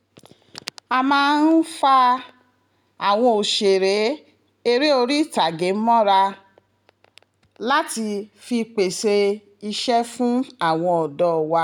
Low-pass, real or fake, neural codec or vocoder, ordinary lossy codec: 19.8 kHz; real; none; none